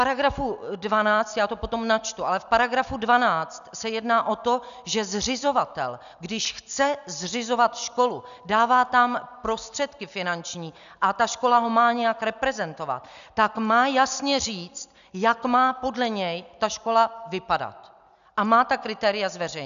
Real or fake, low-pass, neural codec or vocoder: real; 7.2 kHz; none